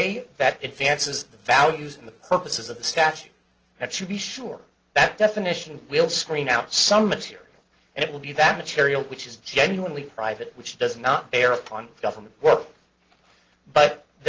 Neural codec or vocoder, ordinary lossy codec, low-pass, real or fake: none; Opus, 16 kbps; 7.2 kHz; real